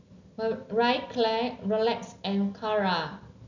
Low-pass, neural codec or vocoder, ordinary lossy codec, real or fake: 7.2 kHz; none; none; real